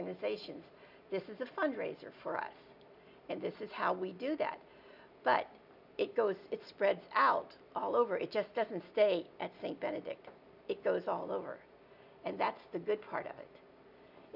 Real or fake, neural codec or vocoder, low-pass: real; none; 5.4 kHz